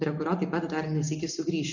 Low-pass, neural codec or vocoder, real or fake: 7.2 kHz; none; real